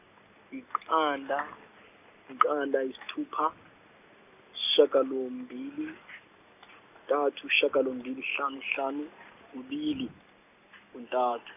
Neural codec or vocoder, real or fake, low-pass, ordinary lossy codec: none; real; 3.6 kHz; none